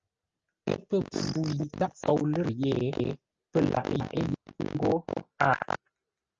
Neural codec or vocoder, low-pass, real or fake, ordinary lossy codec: none; 7.2 kHz; real; Opus, 24 kbps